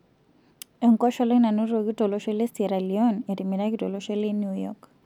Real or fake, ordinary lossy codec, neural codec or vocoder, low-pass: real; none; none; 19.8 kHz